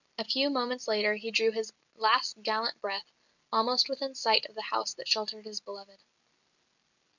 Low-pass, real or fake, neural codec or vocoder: 7.2 kHz; real; none